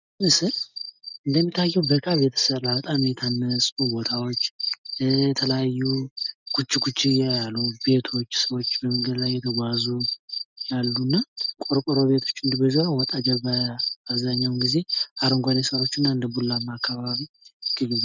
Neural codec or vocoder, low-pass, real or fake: none; 7.2 kHz; real